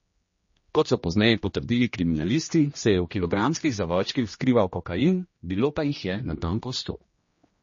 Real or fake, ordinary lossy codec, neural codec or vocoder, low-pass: fake; MP3, 32 kbps; codec, 16 kHz, 1 kbps, X-Codec, HuBERT features, trained on general audio; 7.2 kHz